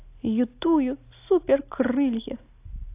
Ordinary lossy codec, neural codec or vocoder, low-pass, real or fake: AAC, 24 kbps; none; 3.6 kHz; real